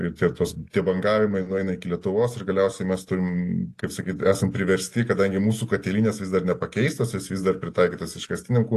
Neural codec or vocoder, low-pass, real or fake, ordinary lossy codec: none; 14.4 kHz; real; AAC, 48 kbps